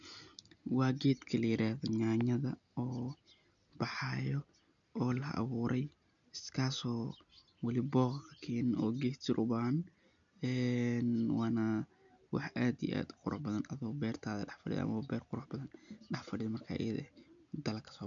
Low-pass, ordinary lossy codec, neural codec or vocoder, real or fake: 7.2 kHz; none; none; real